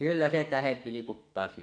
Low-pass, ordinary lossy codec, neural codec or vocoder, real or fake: 9.9 kHz; none; codec, 44.1 kHz, 2.6 kbps, SNAC; fake